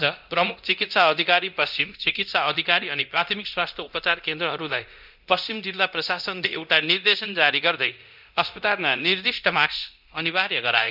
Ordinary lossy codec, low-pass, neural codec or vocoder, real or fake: none; 5.4 kHz; codec, 24 kHz, 0.9 kbps, DualCodec; fake